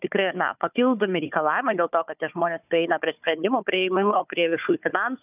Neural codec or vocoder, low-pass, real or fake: codec, 16 kHz, 4 kbps, FunCodec, trained on Chinese and English, 50 frames a second; 3.6 kHz; fake